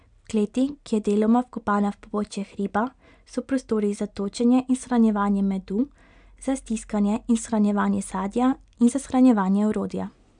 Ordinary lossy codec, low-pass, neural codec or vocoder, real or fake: none; 9.9 kHz; none; real